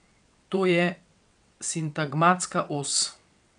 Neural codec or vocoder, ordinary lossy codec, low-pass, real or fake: vocoder, 22.05 kHz, 80 mel bands, WaveNeXt; none; 9.9 kHz; fake